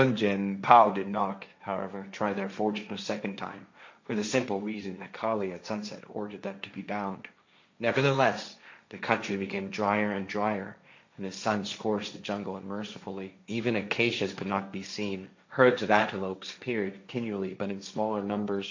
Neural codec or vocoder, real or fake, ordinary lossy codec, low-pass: codec, 16 kHz, 1.1 kbps, Voila-Tokenizer; fake; MP3, 64 kbps; 7.2 kHz